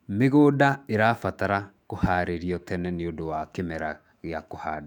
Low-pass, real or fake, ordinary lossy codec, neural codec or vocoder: 19.8 kHz; fake; none; autoencoder, 48 kHz, 128 numbers a frame, DAC-VAE, trained on Japanese speech